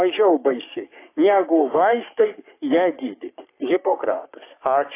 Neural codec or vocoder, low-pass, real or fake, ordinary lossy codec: codec, 44.1 kHz, 3.4 kbps, Pupu-Codec; 3.6 kHz; fake; AAC, 24 kbps